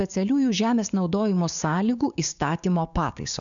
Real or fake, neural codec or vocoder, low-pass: fake; codec, 16 kHz, 6 kbps, DAC; 7.2 kHz